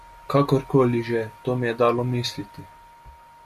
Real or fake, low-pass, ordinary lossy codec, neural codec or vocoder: real; 14.4 kHz; MP3, 96 kbps; none